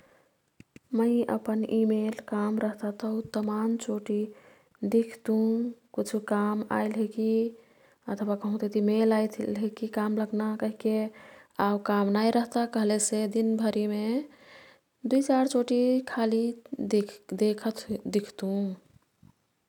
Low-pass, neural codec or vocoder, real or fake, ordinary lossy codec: 19.8 kHz; none; real; none